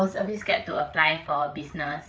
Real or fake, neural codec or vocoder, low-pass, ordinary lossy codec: fake; codec, 16 kHz, 8 kbps, FreqCodec, larger model; none; none